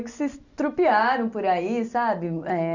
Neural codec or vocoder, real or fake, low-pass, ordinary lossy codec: none; real; 7.2 kHz; none